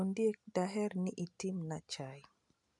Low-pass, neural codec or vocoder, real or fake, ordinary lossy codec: 10.8 kHz; none; real; none